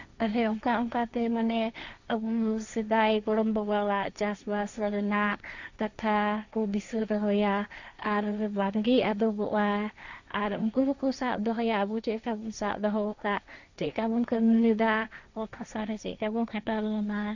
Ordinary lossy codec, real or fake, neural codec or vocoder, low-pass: none; fake; codec, 16 kHz, 1.1 kbps, Voila-Tokenizer; 7.2 kHz